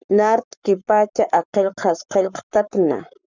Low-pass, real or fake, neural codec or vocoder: 7.2 kHz; fake; codec, 44.1 kHz, 7.8 kbps, Pupu-Codec